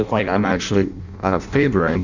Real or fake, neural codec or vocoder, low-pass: fake; codec, 16 kHz in and 24 kHz out, 0.6 kbps, FireRedTTS-2 codec; 7.2 kHz